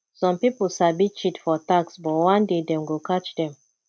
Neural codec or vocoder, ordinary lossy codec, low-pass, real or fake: none; none; none; real